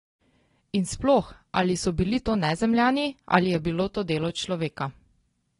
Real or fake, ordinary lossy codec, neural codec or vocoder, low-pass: real; AAC, 32 kbps; none; 10.8 kHz